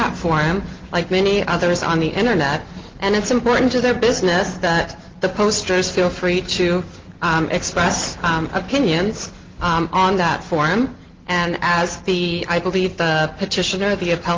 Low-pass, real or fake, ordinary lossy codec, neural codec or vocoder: 7.2 kHz; real; Opus, 16 kbps; none